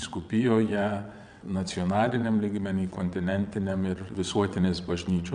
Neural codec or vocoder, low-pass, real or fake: vocoder, 22.05 kHz, 80 mel bands, WaveNeXt; 9.9 kHz; fake